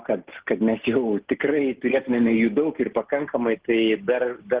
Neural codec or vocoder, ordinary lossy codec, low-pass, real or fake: none; Opus, 16 kbps; 3.6 kHz; real